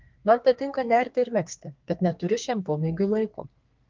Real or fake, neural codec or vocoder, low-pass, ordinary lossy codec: fake; codec, 32 kHz, 1.9 kbps, SNAC; 7.2 kHz; Opus, 32 kbps